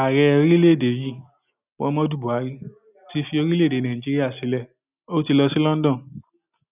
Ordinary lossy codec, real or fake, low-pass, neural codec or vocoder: none; real; 3.6 kHz; none